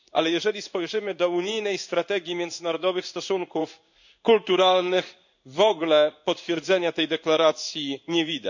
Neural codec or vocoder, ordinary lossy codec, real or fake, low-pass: codec, 16 kHz in and 24 kHz out, 1 kbps, XY-Tokenizer; none; fake; 7.2 kHz